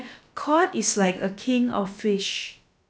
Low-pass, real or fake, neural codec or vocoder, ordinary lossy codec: none; fake; codec, 16 kHz, about 1 kbps, DyCAST, with the encoder's durations; none